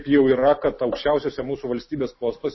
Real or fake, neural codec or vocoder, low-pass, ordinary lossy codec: real; none; 7.2 kHz; MP3, 24 kbps